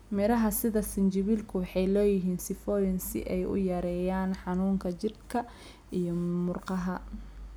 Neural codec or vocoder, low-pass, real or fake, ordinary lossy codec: none; none; real; none